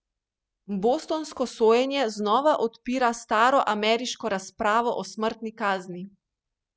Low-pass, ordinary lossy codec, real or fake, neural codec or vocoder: none; none; real; none